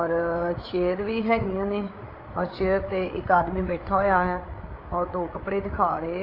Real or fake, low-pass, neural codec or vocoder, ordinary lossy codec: fake; 5.4 kHz; codec, 16 kHz, 8 kbps, FreqCodec, larger model; AAC, 24 kbps